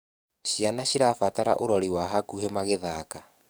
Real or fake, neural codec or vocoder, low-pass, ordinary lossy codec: fake; codec, 44.1 kHz, 7.8 kbps, DAC; none; none